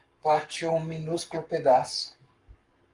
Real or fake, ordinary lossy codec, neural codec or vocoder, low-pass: fake; Opus, 32 kbps; codec, 44.1 kHz, 7.8 kbps, Pupu-Codec; 10.8 kHz